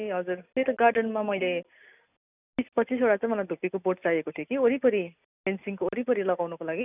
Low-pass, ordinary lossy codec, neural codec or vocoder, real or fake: 3.6 kHz; none; none; real